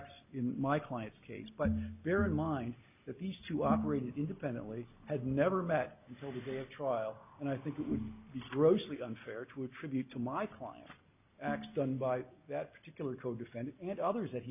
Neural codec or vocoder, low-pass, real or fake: none; 3.6 kHz; real